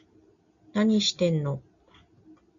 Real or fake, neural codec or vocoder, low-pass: real; none; 7.2 kHz